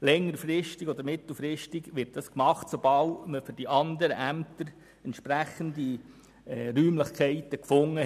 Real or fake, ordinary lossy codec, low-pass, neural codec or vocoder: real; none; 14.4 kHz; none